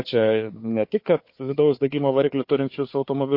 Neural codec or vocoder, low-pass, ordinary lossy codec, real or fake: codec, 16 kHz, 4 kbps, FunCodec, trained on Chinese and English, 50 frames a second; 5.4 kHz; MP3, 32 kbps; fake